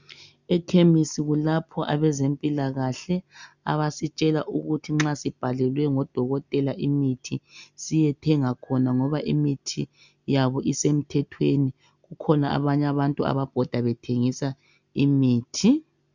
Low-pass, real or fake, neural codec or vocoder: 7.2 kHz; real; none